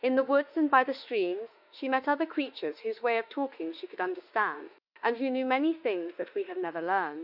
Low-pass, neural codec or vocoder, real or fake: 5.4 kHz; autoencoder, 48 kHz, 32 numbers a frame, DAC-VAE, trained on Japanese speech; fake